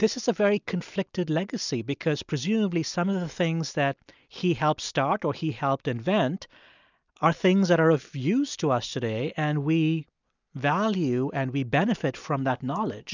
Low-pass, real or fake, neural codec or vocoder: 7.2 kHz; real; none